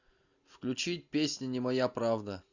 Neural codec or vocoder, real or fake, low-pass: none; real; 7.2 kHz